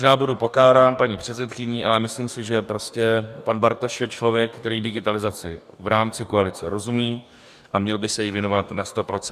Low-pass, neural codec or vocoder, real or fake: 14.4 kHz; codec, 44.1 kHz, 2.6 kbps, DAC; fake